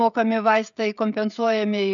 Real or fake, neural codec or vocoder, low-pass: fake; codec, 16 kHz, 16 kbps, FreqCodec, smaller model; 7.2 kHz